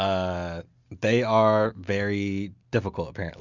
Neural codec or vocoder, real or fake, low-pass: none; real; 7.2 kHz